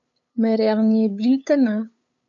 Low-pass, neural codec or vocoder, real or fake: 7.2 kHz; codec, 16 kHz, 8 kbps, FunCodec, trained on LibriTTS, 25 frames a second; fake